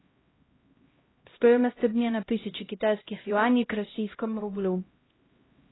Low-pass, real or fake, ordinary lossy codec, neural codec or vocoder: 7.2 kHz; fake; AAC, 16 kbps; codec, 16 kHz, 0.5 kbps, X-Codec, HuBERT features, trained on LibriSpeech